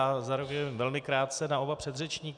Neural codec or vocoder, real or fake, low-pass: none; real; 9.9 kHz